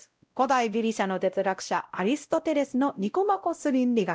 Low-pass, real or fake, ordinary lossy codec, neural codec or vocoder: none; fake; none; codec, 16 kHz, 0.5 kbps, X-Codec, WavLM features, trained on Multilingual LibriSpeech